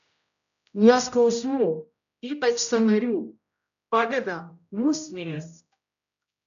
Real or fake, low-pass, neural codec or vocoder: fake; 7.2 kHz; codec, 16 kHz, 0.5 kbps, X-Codec, HuBERT features, trained on general audio